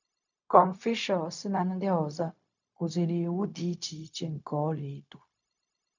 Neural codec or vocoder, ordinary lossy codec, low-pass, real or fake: codec, 16 kHz, 0.4 kbps, LongCat-Audio-Codec; none; 7.2 kHz; fake